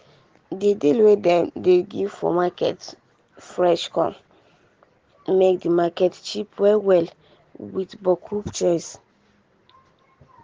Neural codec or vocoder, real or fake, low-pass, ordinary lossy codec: none; real; 7.2 kHz; Opus, 16 kbps